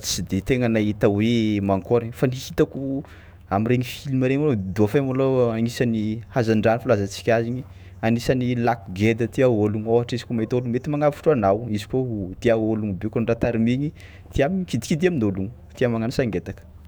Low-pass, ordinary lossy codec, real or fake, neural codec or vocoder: none; none; fake; autoencoder, 48 kHz, 128 numbers a frame, DAC-VAE, trained on Japanese speech